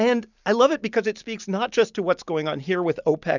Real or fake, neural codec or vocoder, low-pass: real; none; 7.2 kHz